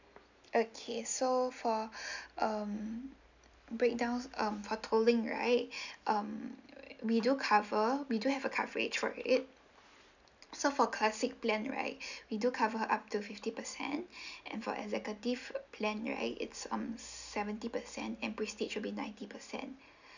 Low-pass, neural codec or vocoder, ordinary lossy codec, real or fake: 7.2 kHz; none; none; real